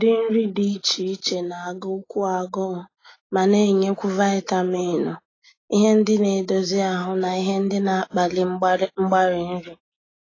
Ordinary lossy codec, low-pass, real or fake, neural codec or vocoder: AAC, 32 kbps; 7.2 kHz; real; none